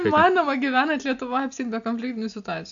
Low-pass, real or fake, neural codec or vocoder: 7.2 kHz; real; none